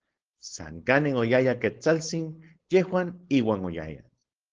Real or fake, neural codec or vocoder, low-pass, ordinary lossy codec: fake; codec, 16 kHz, 4.8 kbps, FACodec; 7.2 kHz; Opus, 16 kbps